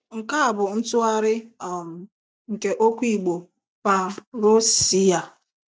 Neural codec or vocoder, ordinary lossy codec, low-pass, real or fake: none; none; none; real